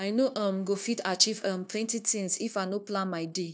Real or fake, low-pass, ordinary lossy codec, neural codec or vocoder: fake; none; none; codec, 16 kHz, 0.9 kbps, LongCat-Audio-Codec